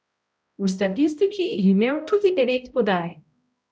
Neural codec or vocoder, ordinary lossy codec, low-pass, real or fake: codec, 16 kHz, 0.5 kbps, X-Codec, HuBERT features, trained on balanced general audio; none; none; fake